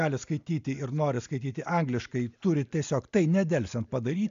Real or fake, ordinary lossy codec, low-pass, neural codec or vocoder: real; MP3, 64 kbps; 7.2 kHz; none